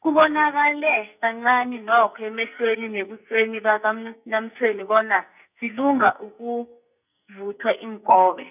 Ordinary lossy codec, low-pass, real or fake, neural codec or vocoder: none; 3.6 kHz; fake; codec, 44.1 kHz, 2.6 kbps, SNAC